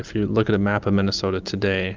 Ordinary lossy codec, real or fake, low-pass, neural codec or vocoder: Opus, 32 kbps; real; 7.2 kHz; none